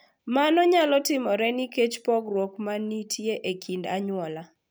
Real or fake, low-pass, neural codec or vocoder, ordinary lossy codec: real; none; none; none